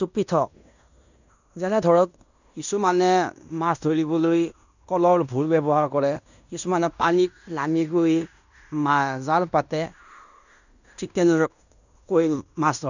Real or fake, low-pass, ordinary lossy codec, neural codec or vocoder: fake; 7.2 kHz; none; codec, 16 kHz in and 24 kHz out, 0.9 kbps, LongCat-Audio-Codec, fine tuned four codebook decoder